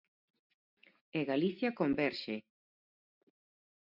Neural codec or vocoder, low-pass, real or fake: none; 5.4 kHz; real